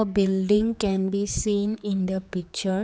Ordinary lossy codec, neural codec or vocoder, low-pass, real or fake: none; codec, 16 kHz, 4 kbps, X-Codec, HuBERT features, trained on general audio; none; fake